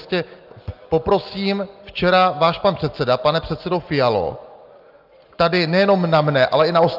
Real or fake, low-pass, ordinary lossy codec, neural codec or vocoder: real; 5.4 kHz; Opus, 32 kbps; none